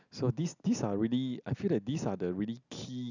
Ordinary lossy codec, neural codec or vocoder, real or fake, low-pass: none; none; real; 7.2 kHz